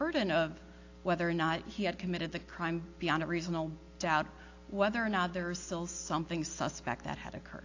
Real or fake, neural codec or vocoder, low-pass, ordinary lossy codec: real; none; 7.2 kHz; AAC, 48 kbps